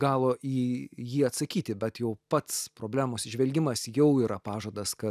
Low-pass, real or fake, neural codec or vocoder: 14.4 kHz; real; none